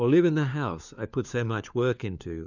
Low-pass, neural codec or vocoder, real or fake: 7.2 kHz; codec, 16 kHz, 4 kbps, FunCodec, trained on LibriTTS, 50 frames a second; fake